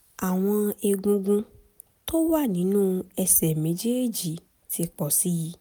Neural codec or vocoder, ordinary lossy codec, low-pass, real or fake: none; none; none; real